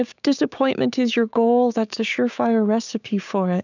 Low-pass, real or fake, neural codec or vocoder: 7.2 kHz; fake; codec, 16 kHz, 6 kbps, DAC